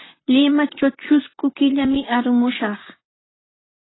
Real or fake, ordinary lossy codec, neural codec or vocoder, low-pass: fake; AAC, 16 kbps; vocoder, 44.1 kHz, 128 mel bands, Pupu-Vocoder; 7.2 kHz